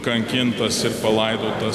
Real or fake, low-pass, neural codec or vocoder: real; 14.4 kHz; none